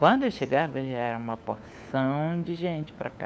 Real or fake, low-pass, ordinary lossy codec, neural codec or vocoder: fake; none; none; codec, 16 kHz, 4 kbps, FunCodec, trained on LibriTTS, 50 frames a second